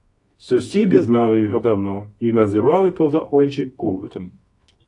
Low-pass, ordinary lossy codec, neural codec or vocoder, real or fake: 10.8 kHz; AAC, 48 kbps; codec, 24 kHz, 0.9 kbps, WavTokenizer, medium music audio release; fake